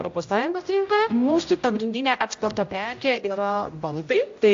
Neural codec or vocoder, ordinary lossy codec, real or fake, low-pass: codec, 16 kHz, 0.5 kbps, X-Codec, HuBERT features, trained on general audio; AAC, 48 kbps; fake; 7.2 kHz